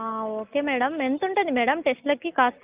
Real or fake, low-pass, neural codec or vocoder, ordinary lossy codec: real; 3.6 kHz; none; Opus, 24 kbps